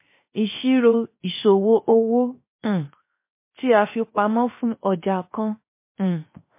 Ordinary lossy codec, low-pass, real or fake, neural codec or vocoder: MP3, 24 kbps; 3.6 kHz; fake; codec, 16 kHz, 0.7 kbps, FocalCodec